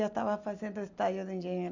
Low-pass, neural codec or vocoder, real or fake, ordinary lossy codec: 7.2 kHz; none; real; none